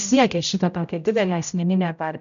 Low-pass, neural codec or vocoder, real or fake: 7.2 kHz; codec, 16 kHz, 0.5 kbps, X-Codec, HuBERT features, trained on general audio; fake